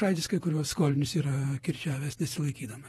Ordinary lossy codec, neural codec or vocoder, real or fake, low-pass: AAC, 32 kbps; vocoder, 48 kHz, 128 mel bands, Vocos; fake; 19.8 kHz